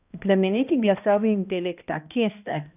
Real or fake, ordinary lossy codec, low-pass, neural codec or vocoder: fake; none; 3.6 kHz; codec, 16 kHz, 1 kbps, X-Codec, HuBERT features, trained on balanced general audio